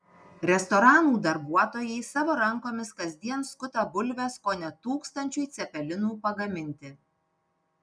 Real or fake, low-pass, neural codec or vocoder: real; 9.9 kHz; none